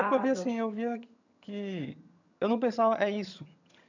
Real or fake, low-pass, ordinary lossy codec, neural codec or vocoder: fake; 7.2 kHz; none; vocoder, 22.05 kHz, 80 mel bands, HiFi-GAN